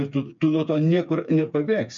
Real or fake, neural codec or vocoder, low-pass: fake; codec, 16 kHz, 4 kbps, FreqCodec, smaller model; 7.2 kHz